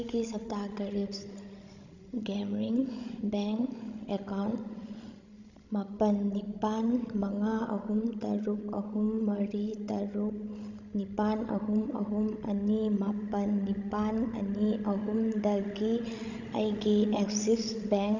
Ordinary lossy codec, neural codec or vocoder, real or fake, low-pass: none; codec, 16 kHz, 16 kbps, FreqCodec, larger model; fake; 7.2 kHz